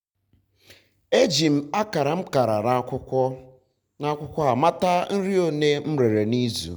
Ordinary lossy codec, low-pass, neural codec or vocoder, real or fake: none; none; none; real